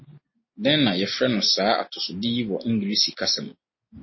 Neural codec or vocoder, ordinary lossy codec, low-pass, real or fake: none; MP3, 24 kbps; 7.2 kHz; real